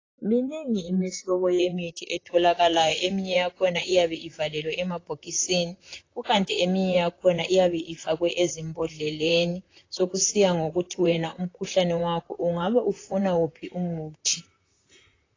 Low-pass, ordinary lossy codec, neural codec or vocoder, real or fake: 7.2 kHz; AAC, 32 kbps; vocoder, 44.1 kHz, 128 mel bands, Pupu-Vocoder; fake